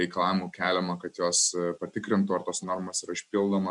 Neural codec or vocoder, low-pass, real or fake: none; 10.8 kHz; real